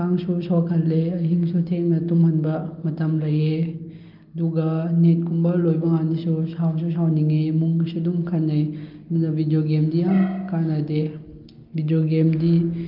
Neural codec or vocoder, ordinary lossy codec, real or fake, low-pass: none; Opus, 24 kbps; real; 5.4 kHz